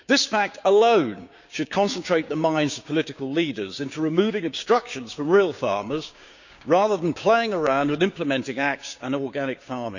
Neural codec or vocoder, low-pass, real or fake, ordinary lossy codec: codec, 16 kHz, 6 kbps, DAC; 7.2 kHz; fake; none